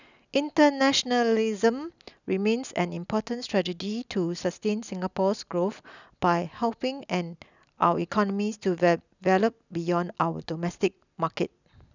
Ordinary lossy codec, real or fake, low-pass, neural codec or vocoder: none; real; 7.2 kHz; none